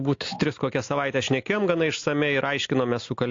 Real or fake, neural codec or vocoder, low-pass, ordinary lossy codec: real; none; 7.2 kHz; AAC, 48 kbps